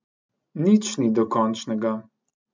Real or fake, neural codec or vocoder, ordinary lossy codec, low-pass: real; none; MP3, 64 kbps; 7.2 kHz